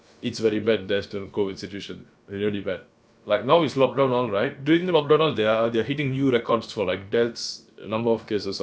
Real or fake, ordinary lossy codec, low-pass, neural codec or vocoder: fake; none; none; codec, 16 kHz, about 1 kbps, DyCAST, with the encoder's durations